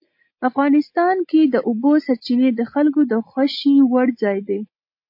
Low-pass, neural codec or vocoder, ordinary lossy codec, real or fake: 5.4 kHz; none; MP3, 32 kbps; real